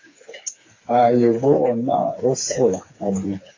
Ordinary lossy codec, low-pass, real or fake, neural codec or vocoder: AAC, 32 kbps; 7.2 kHz; fake; codec, 16 kHz, 4 kbps, FreqCodec, smaller model